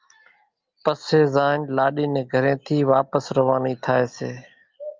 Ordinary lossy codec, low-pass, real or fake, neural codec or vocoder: Opus, 24 kbps; 7.2 kHz; real; none